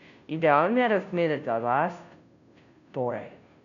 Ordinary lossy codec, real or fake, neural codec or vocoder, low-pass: none; fake; codec, 16 kHz, 0.5 kbps, FunCodec, trained on Chinese and English, 25 frames a second; 7.2 kHz